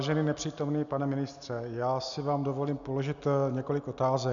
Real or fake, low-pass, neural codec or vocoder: real; 7.2 kHz; none